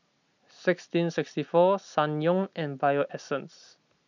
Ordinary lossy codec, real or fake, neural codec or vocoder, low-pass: none; real; none; 7.2 kHz